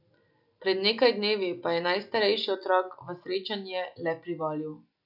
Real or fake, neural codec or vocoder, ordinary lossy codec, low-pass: real; none; none; 5.4 kHz